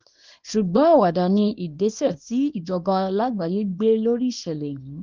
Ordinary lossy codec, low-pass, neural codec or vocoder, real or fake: Opus, 32 kbps; 7.2 kHz; codec, 24 kHz, 0.9 kbps, WavTokenizer, small release; fake